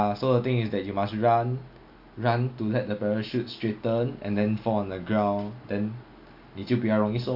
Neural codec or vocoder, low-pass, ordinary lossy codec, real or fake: none; 5.4 kHz; AAC, 48 kbps; real